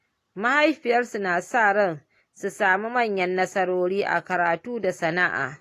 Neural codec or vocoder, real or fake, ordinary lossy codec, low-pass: none; real; AAC, 48 kbps; 14.4 kHz